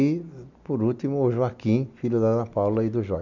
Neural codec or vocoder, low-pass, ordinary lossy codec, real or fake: none; 7.2 kHz; none; real